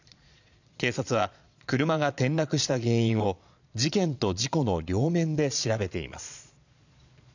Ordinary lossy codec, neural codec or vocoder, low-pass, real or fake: none; vocoder, 22.05 kHz, 80 mel bands, Vocos; 7.2 kHz; fake